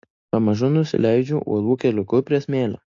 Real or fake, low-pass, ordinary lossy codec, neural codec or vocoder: real; 7.2 kHz; AAC, 48 kbps; none